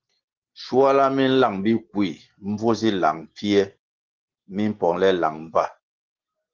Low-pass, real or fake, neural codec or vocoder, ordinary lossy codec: 7.2 kHz; real; none; Opus, 16 kbps